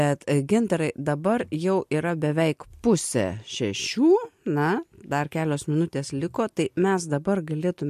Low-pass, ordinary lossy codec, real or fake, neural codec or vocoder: 14.4 kHz; MP3, 64 kbps; real; none